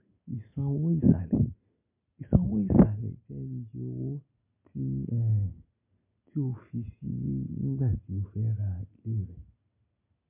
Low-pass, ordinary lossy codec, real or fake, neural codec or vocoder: 3.6 kHz; MP3, 32 kbps; real; none